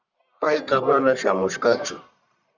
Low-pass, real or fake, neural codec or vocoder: 7.2 kHz; fake; codec, 44.1 kHz, 1.7 kbps, Pupu-Codec